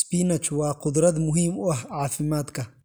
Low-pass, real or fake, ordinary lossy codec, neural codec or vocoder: none; real; none; none